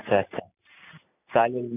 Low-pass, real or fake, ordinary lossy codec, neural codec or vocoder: 3.6 kHz; real; none; none